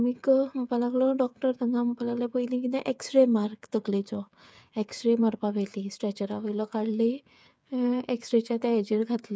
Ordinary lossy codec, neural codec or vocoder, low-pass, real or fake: none; codec, 16 kHz, 8 kbps, FreqCodec, smaller model; none; fake